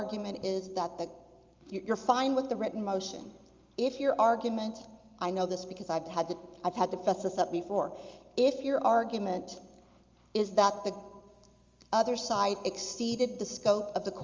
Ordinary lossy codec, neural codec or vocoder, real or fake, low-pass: Opus, 32 kbps; none; real; 7.2 kHz